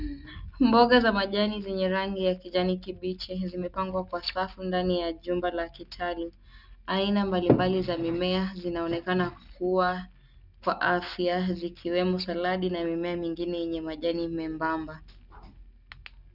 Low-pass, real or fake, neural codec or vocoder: 5.4 kHz; real; none